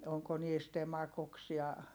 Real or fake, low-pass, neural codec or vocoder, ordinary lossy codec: real; none; none; none